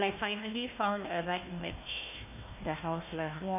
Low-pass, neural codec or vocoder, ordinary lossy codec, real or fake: 3.6 kHz; codec, 16 kHz, 1 kbps, FunCodec, trained on Chinese and English, 50 frames a second; none; fake